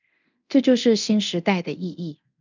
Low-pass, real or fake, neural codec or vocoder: 7.2 kHz; fake; codec, 24 kHz, 0.9 kbps, DualCodec